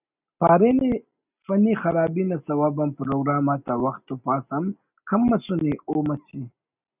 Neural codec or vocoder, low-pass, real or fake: none; 3.6 kHz; real